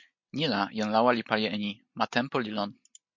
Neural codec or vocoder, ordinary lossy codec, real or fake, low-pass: none; MP3, 48 kbps; real; 7.2 kHz